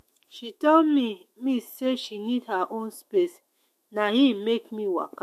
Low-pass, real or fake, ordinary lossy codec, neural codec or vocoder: 14.4 kHz; fake; MP3, 64 kbps; autoencoder, 48 kHz, 128 numbers a frame, DAC-VAE, trained on Japanese speech